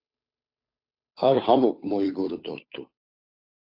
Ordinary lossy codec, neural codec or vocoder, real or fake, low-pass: AAC, 48 kbps; codec, 16 kHz, 2 kbps, FunCodec, trained on Chinese and English, 25 frames a second; fake; 5.4 kHz